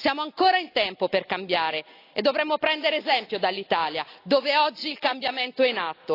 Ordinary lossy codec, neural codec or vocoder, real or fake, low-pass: AAC, 32 kbps; none; real; 5.4 kHz